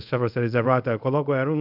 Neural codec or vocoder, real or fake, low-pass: codec, 24 kHz, 0.5 kbps, DualCodec; fake; 5.4 kHz